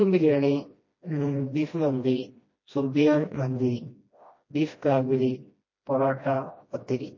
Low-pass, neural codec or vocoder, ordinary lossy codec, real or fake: 7.2 kHz; codec, 16 kHz, 1 kbps, FreqCodec, smaller model; MP3, 32 kbps; fake